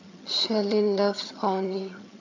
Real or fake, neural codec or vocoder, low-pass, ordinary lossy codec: fake; vocoder, 22.05 kHz, 80 mel bands, HiFi-GAN; 7.2 kHz; AAC, 48 kbps